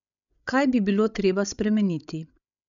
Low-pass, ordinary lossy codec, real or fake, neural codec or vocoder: 7.2 kHz; none; fake; codec, 16 kHz, 16 kbps, FreqCodec, larger model